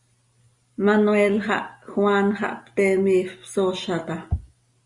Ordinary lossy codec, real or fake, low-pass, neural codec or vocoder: Opus, 64 kbps; real; 10.8 kHz; none